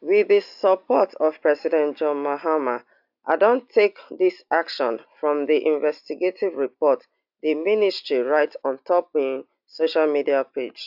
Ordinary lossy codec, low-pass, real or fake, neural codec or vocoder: AAC, 48 kbps; 5.4 kHz; real; none